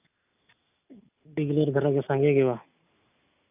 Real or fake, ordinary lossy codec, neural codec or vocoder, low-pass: real; none; none; 3.6 kHz